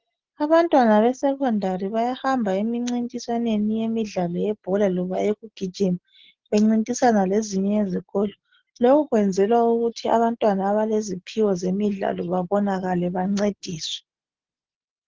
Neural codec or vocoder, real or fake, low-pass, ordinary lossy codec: none; real; 7.2 kHz; Opus, 16 kbps